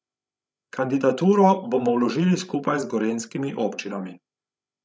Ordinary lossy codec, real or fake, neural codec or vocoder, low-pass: none; fake; codec, 16 kHz, 16 kbps, FreqCodec, larger model; none